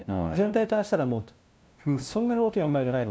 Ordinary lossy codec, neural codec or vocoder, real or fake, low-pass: none; codec, 16 kHz, 0.5 kbps, FunCodec, trained on LibriTTS, 25 frames a second; fake; none